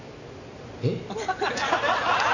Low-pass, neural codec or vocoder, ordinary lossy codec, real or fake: 7.2 kHz; none; none; real